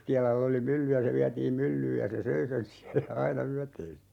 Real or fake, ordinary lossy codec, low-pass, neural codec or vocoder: real; none; 19.8 kHz; none